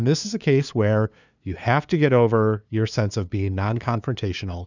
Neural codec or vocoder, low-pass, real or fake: codec, 16 kHz, 2 kbps, FunCodec, trained on LibriTTS, 25 frames a second; 7.2 kHz; fake